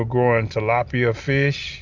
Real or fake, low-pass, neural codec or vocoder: real; 7.2 kHz; none